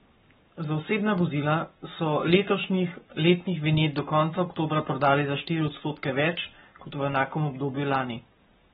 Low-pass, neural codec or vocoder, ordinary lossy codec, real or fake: 19.8 kHz; codec, 44.1 kHz, 7.8 kbps, Pupu-Codec; AAC, 16 kbps; fake